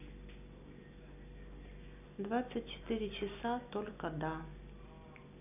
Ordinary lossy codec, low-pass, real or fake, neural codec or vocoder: none; 3.6 kHz; real; none